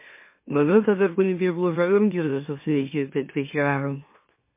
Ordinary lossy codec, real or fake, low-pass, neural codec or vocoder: MP3, 24 kbps; fake; 3.6 kHz; autoencoder, 44.1 kHz, a latent of 192 numbers a frame, MeloTTS